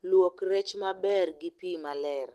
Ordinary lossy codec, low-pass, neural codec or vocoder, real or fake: Opus, 16 kbps; 14.4 kHz; none; real